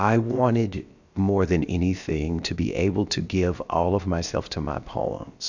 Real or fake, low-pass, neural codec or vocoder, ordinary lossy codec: fake; 7.2 kHz; codec, 16 kHz, about 1 kbps, DyCAST, with the encoder's durations; Opus, 64 kbps